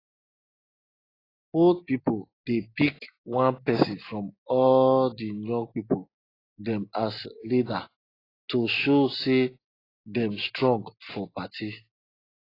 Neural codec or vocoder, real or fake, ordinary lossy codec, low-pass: none; real; AAC, 24 kbps; 5.4 kHz